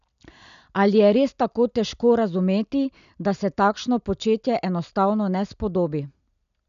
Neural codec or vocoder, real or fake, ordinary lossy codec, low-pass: none; real; none; 7.2 kHz